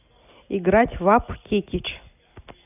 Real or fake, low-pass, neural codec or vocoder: real; 3.6 kHz; none